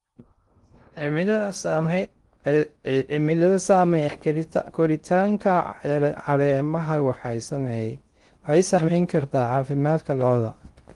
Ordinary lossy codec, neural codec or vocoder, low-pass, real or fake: Opus, 24 kbps; codec, 16 kHz in and 24 kHz out, 0.6 kbps, FocalCodec, streaming, 2048 codes; 10.8 kHz; fake